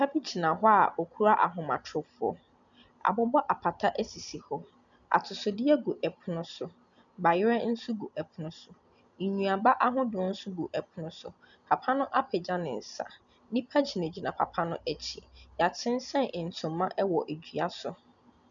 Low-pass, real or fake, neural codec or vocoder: 7.2 kHz; real; none